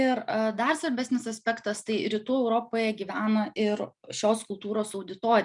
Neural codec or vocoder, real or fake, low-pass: none; real; 10.8 kHz